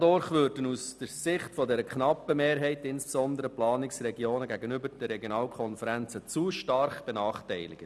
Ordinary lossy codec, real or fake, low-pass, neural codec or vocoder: none; real; none; none